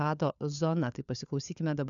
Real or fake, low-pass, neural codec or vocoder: fake; 7.2 kHz; codec, 16 kHz, 4 kbps, FunCodec, trained on LibriTTS, 50 frames a second